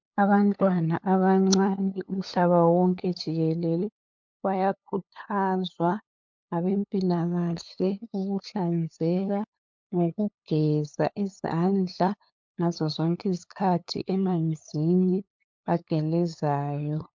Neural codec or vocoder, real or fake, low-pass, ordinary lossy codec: codec, 16 kHz, 8 kbps, FunCodec, trained on LibriTTS, 25 frames a second; fake; 7.2 kHz; MP3, 64 kbps